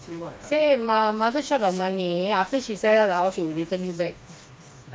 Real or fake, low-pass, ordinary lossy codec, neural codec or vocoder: fake; none; none; codec, 16 kHz, 2 kbps, FreqCodec, smaller model